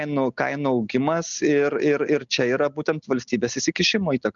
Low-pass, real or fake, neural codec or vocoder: 7.2 kHz; real; none